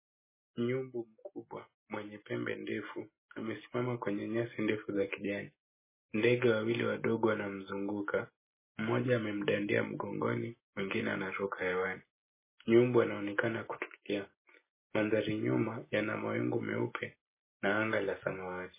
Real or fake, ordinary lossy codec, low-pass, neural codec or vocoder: real; MP3, 16 kbps; 3.6 kHz; none